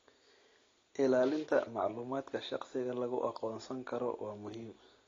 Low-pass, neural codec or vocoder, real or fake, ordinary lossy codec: 7.2 kHz; none; real; AAC, 32 kbps